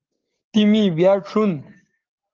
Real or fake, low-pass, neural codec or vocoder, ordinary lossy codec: fake; 7.2 kHz; codec, 44.1 kHz, 7.8 kbps, DAC; Opus, 32 kbps